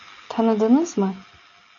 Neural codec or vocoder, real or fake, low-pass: none; real; 7.2 kHz